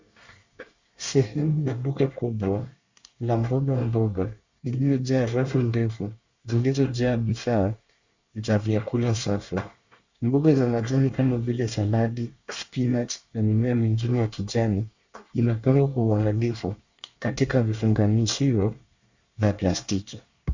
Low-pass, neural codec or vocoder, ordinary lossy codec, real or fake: 7.2 kHz; codec, 24 kHz, 1 kbps, SNAC; Opus, 64 kbps; fake